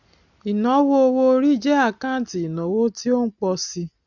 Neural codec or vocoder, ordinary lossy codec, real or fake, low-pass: none; none; real; 7.2 kHz